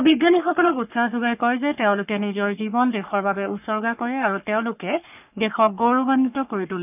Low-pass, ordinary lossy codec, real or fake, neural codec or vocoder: 3.6 kHz; none; fake; codec, 44.1 kHz, 3.4 kbps, Pupu-Codec